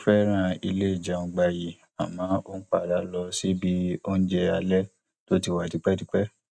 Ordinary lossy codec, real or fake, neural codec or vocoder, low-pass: none; real; none; none